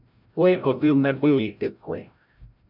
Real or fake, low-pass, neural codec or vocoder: fake; 5.4 kHz; codec, 16 kHz, 0.5 kbps, FreqCodec, larger model